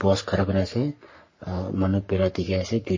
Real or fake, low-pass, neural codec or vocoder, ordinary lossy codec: fake; 7.2 kHz; codec, 44.1 kHz, 3.4 kbps, Pupu-Codec; MP3, 32 kbps